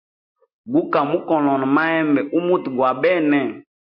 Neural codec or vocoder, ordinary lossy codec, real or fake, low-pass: none; MP3, 32 kbps; real; 5.4 kHz